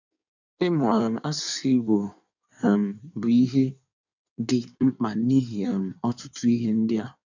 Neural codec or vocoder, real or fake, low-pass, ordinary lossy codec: codec, 16 kHz in and 24 kHz out, 1.1 kbps, FireRedTTS-2 codec; fake; 7.2 kHz; none